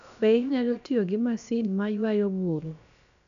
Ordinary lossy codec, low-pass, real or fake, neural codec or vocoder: none; 7.2 kHz; fake; codec, 16 kHz, about 1 kbps, DyCAST, with the encoder's durations